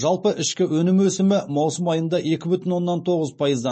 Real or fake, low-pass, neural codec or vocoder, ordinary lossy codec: real; 9.9 kHz; none; MP3, 32 kbps